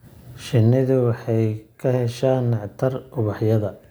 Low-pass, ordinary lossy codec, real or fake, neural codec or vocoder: none; none; real; none